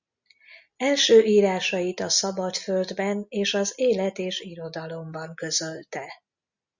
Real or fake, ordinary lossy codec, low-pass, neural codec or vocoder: real; Opus, 64 kbps; 7.2 kHz; none